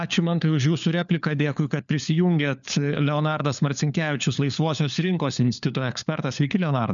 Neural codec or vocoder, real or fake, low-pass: codec, 16 kHz, 4 kbps, FunCodec, trained on LibriTTS, 50 frames a second; fake; 7.2 kHz